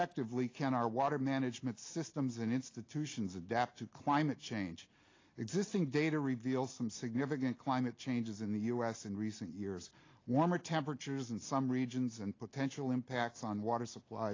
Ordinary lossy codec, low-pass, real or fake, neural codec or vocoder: AAC, 32 kbps; 7.2 kHz; real; none